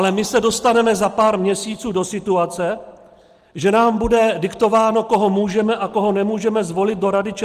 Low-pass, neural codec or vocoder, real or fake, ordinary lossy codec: 14.4 kHz; none; real; Opus, 24 kbps